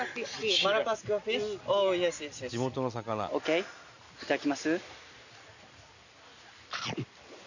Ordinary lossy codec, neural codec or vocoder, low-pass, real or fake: none; none; 7.2 kHz; real